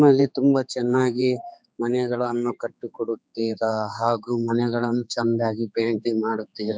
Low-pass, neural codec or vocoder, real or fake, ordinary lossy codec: none; codec, 16 kHz, 4 kbps, X-Codec, HuBERT features, trained on general audio; fake; none